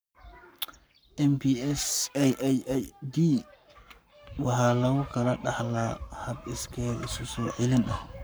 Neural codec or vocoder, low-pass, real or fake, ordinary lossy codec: codec, 44.1 kHz, 7.8 kbps, Pupu-Codec; none; fake; none